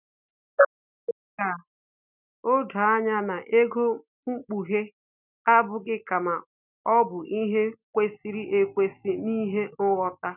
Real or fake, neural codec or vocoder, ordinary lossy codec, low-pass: real; none; none; 3.6 kHz